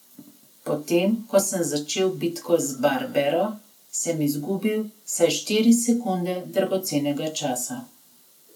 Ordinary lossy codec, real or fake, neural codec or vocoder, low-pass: none; real; none; none